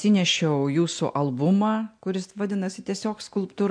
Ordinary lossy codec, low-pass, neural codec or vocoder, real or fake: MP3, 64 kbps; 9.9 kHz; none; real